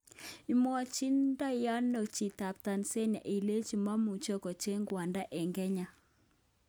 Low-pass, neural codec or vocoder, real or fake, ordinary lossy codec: none; none; real; none